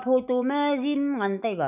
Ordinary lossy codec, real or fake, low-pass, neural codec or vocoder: none; fake; 3.6 kHz; autoencoder, 48 kHz, 128 numbers a frame, DAC-VAE, trained on Japanese speech